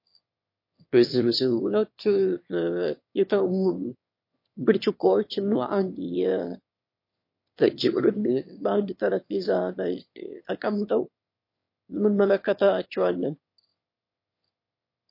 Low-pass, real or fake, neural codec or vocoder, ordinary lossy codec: 5.4 kHz; fake; autoencoder, 22.05 kHz, a latent of 192 numbers a frame, VITS, trained on one speaker; MP3, 32 kbps